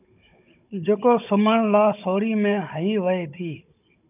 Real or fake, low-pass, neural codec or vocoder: fake; 3.6 kHz; codec, 16 kHz, 16 kbps, FunCodec, trained on Chinese and English, 50 frames a second